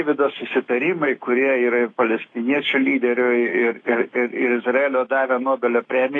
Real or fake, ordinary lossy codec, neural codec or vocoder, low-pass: fake; AAC, 32 kbps; autoencoder, 48 kHz, 128 numbers a frame, DAC-VAE, trained on Japanese speech; 9.9 kHz